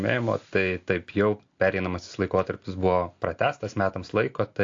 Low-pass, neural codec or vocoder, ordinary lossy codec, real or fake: 7.2 kHz; none; AAC, 48 kbps; real